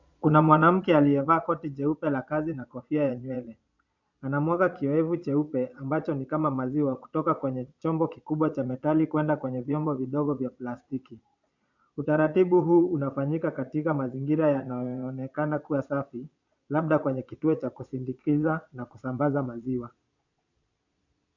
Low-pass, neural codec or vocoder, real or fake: 7.2 kHz; vocoder, 22.05 kHz, 80 mel bands, Vocos; fake